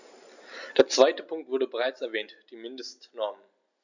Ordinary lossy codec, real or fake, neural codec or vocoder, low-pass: none; real; none; 7.2 kHz